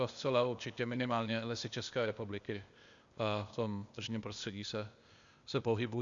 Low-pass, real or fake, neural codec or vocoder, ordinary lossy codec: 7.2 kHz; fake; codec, 16 kHz, 0.8 kbps, ZipCodec; Opus, 64 kbps